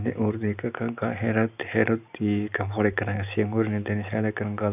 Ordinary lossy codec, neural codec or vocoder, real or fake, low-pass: none; none; real; 3.6 kHz